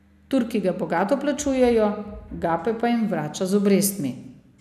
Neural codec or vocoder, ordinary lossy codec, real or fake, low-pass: none; none; real; 14.4 kHz